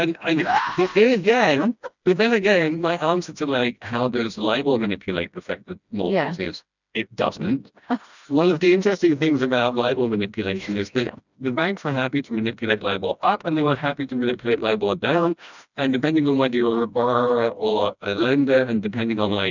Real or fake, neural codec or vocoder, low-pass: fake; codec, 16 kHz, 1 kbps, FreqCodec, smaller model; 7.2 kHz